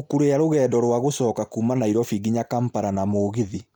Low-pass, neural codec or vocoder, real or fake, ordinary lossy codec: none; none; real; none